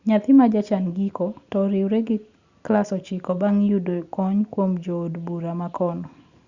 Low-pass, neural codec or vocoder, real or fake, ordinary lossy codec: 7.2 kHz; none; real; Opus, 64 kbps